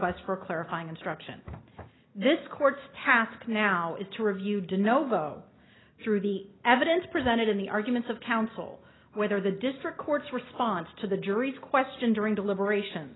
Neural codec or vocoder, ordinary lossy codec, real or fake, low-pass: none; AAC, 16 kbps; real; 7.2 kHz